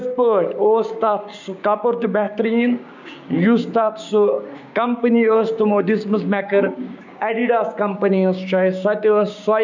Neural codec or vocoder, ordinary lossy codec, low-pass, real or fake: codec, 16 kHz, 6 kbps, DAC; none; 7.2 kHz; fake